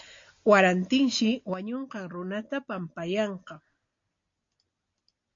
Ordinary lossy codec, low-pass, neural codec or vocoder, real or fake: AAC, 48 kbps; 7.2 kHz; none; real